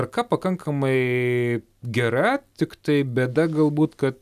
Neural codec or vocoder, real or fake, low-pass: none; real; 14.4 kHz